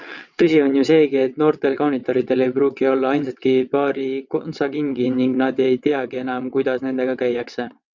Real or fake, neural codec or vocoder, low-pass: fake; vocoder, 22.05 kHz, 80 mel bands, WaveNeXt; 7.2 kHz